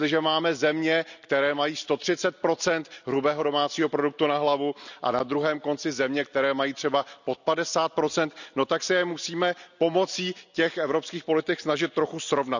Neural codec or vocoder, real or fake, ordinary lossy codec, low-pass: none; real; none; 7.2 kHz